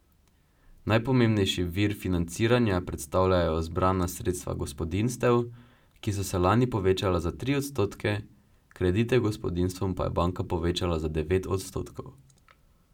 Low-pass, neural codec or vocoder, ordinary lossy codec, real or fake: 19.8 kHz; none; none; real